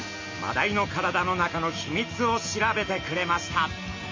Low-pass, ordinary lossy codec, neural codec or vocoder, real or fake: 7.2 kHz; MP3, 64 kbps; none; real